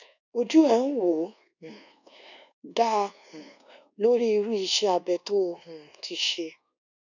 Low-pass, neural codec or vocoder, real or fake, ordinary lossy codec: 7.2 kHz; codec, 24 kHz, 1.2 kbps, DualCodec; fake; none